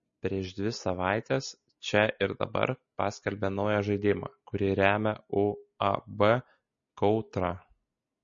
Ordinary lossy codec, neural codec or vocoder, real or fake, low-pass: MP3, 32 kbps; none; real; 7.2 kHz